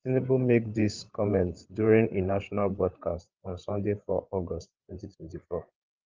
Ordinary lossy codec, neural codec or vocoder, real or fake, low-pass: Opus, 32 kbps; codec, 16 kHz, 8 kbps, FreqCodec, larger model; fake; 7.2 kHz